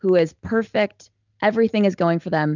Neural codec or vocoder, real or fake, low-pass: none; real; 7.2 kHz